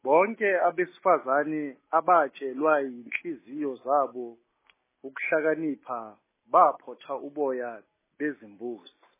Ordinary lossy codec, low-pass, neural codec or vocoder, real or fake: MP3, 16 kbps; 3.6 kHz; none; real